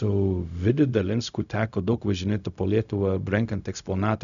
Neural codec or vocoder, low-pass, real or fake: codec, 16 kHz, 0.4 kbps, LongCat-Audio-Codec; 7.2 kHz; fake